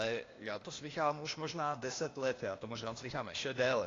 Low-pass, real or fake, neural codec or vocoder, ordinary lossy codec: 7.2 kHz; fake; codec, 16 kHz, 0.8 kbps, ZipCodec; AAC, 32 kbps